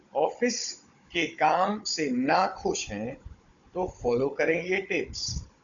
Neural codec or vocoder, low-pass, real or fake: codec, 16 kHz, 16 kbps, FunCodec, trained on Chinese and English, 50 frames a second; 7.2 kHz; fake